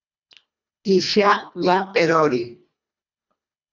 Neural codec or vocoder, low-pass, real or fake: codec, 24 kHz, 1.5 kbps, HILCodec; 7.2 kHz; fake